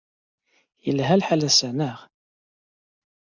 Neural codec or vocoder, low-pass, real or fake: none; 7.2 kHz; real